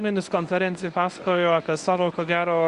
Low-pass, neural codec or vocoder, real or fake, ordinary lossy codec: 10.8 kHz; codec, 24 kHz, 0.9 kbps, WavTokenizer, medium speech release version 1; fake; Opus, 64 kbps